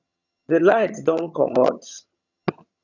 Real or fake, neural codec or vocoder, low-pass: fake; vocoder, 22.05 kHz, 80 mel bands, HiFi-GAN; 7.2 kHz